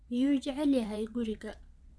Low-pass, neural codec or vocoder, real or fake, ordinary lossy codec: none; vocoder, 22.05 kHz, 80 mel bands, Vocos; fake; none